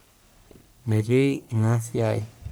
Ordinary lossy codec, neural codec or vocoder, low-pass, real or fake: none; codec, 44.1 kHz, 1.7 kbps, Pupu-Codec; none; fake